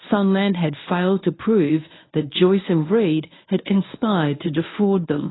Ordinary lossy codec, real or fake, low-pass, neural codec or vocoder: AAC, 16 kbps; fake; 7.2 kHz; codec, 24 kHz, 0.9 kbps, WavTokenizer, medium speech release version 1